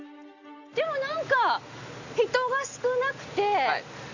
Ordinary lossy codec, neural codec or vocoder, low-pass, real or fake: none; none; 7.2 kHz; real